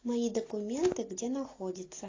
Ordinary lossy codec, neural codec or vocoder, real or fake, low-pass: AAC, 32 kbps; none; real; 7.2 kHz